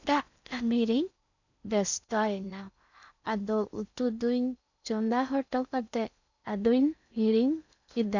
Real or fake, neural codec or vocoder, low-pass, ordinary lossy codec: fake; codec, 16 kHz in and 24 kHz out, 0.6 kbps, FocalCodec, streaming, 4096 codes; 7.2 kHz; none